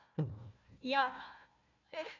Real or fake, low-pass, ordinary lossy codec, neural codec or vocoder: fake; 7.2 kHz; none; codec, 16 kHz, 1 kbps, FunCodec, trained on Chinese and English, 50 frames a second